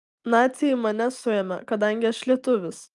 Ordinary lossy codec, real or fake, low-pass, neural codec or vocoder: Opus, 32 kbps; real; 10.8 kHz; none